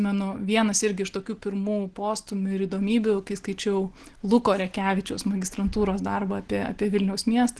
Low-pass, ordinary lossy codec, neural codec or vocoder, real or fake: 10.8 kHz; Opus, 16 kbps; none; real